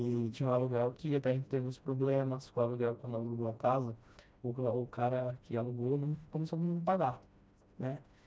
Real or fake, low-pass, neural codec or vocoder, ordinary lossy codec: fake; none; codec, 16 kHz, 1 kbps, FreqCodec, smaller model; none